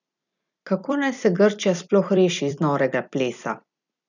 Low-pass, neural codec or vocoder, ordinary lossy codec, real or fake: 7.2 kHz; vocoder, 44.1 kHz, 128 mel bands every 256 samples, BigVGAN v2; none; fake